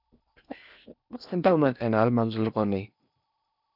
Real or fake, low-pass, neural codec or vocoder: fake; 5.4 kHz; codec, 16 kHz in and 24 kHz out, 0.8 kbps, FocalCodec, streaming, 65536 codes